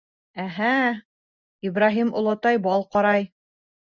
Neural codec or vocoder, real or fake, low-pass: none; real; 7.2 kHz